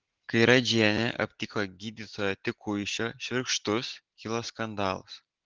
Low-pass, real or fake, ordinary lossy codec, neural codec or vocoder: 7.2 kHz; real; Opus, 16 kbps; none